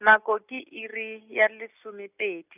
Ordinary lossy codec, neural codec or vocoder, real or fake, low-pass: none; none; real; 3.6 kHz